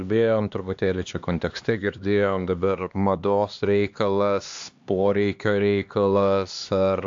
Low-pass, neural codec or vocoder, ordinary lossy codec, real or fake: 7.2 kHz; codec, 16 kHz, 2 kbps, X-Codec, HuBERT features, trained on LibriSpeech; AAC, 64 kbps; fake